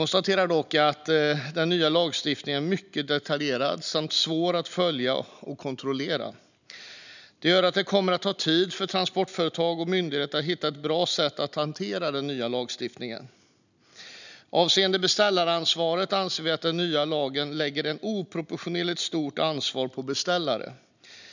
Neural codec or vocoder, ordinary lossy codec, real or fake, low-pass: none; none; real; 7.2 kHz